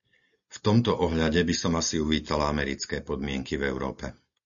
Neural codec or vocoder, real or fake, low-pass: none; real; 7.2 kHz